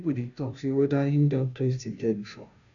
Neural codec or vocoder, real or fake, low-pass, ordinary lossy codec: codec, 16 kHz, 0.5 kbps, FunCodec, trained on Chinese and English, 25 frames a second; fake; 7.2 kHz; none